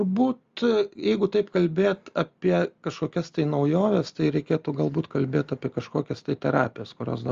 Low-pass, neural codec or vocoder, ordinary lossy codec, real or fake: 7.2 kHz; none; Opus, 32 kbps; real